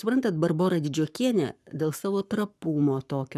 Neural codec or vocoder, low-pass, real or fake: codec, 44.1 kHz, 7.8 kbps, Pupu-Codec; 14.4 kHz; fake